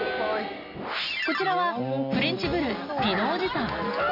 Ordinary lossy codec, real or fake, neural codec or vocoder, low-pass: none; real; none; 5.4 kHz